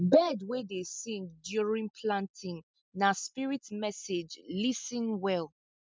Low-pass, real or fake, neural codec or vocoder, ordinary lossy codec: none; real; none; none